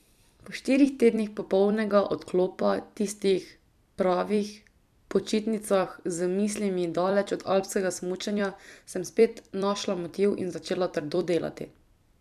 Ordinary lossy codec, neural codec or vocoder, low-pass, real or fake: none; vocoder, 44.1 kHz, 128 mel bands every 256 samples, BigVGAN v2; 14.4 kHz; fake